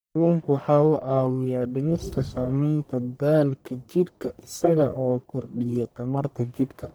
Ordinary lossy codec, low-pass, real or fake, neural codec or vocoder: none; none; fake; codec, 44.1 kHz, 1.7 kbps, Pupu-Codec